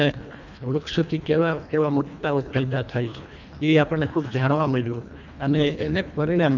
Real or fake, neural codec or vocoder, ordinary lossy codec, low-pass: fake; codec, 24 kHz, 1.5 kbps, HILCodec; none; 7.2 kHz